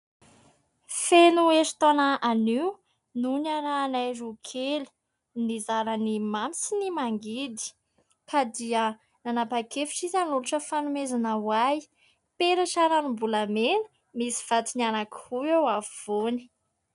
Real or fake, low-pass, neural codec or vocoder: real; 10.8 kHz; none